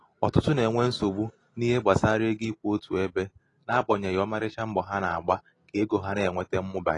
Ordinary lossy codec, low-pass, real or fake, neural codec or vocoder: AAC, 32 kbps; 10.8 kHz; real; none